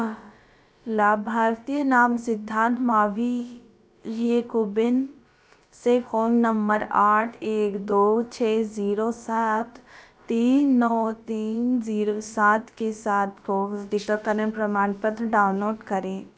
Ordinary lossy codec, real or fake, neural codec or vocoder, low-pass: none; fake; codec, 16 kHz, about 1 kbps, DyCAST, with the encoder's durations; none